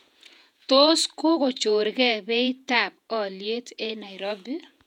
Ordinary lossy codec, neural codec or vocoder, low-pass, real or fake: none; vocoder, 48 kHz, 128 mel bands, Vocos; 19.8 kHz; fake